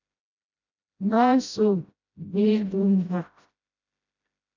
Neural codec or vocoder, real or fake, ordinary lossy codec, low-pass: codec, 16 kHz, 0.5 kbps, FreqCodec, smaller model; fake; MP3, 64 kbps; 7.2 kHz